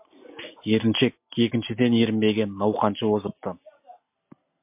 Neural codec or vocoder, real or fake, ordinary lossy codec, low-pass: none; real; MP3, 32 kbps; 3.6 kHz